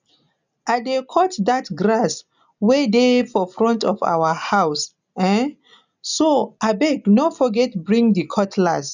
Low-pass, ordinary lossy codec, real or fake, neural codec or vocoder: 7.2 kHz; none; real; none